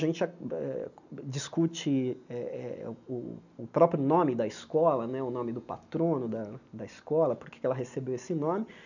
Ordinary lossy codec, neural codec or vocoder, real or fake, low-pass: none; none; real; 7.2 kHz